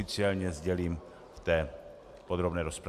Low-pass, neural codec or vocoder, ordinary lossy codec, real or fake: 14.4 kHz; none; AAC, 96 kbps; real